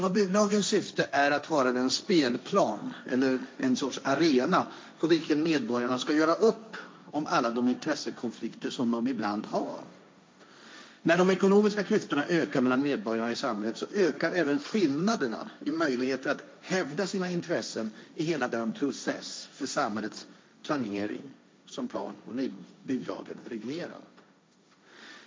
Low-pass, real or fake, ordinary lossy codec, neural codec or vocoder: none; fake; none; codec, 16 kHz, 1.1 kbps, Voila-Tokenizer